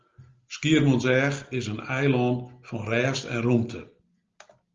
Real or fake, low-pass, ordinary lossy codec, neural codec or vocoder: real; 7.2 kHz; Opus, 32 kbps; none